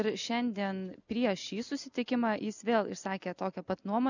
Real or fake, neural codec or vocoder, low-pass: real; none; 7.2 kHz